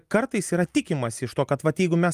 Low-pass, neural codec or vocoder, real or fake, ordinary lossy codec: 14.4 kHz; none; real; Opus, 32 kbps